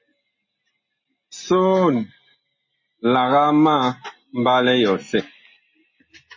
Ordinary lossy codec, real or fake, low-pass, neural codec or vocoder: MP3, 32 kbps; real; 7.2 kHz; none